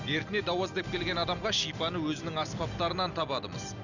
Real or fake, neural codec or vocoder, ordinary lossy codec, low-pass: real; none; none; 7.2 kHz